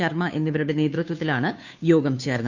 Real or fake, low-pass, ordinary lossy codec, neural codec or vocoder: fake; 7.2 kHz; none; codec, 16 kHz, 2 kbps, FunCodec, trained on Chinese and English, 25 frames a second